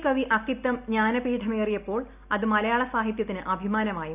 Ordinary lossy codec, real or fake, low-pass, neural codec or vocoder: none; real; 3.6 kHz; none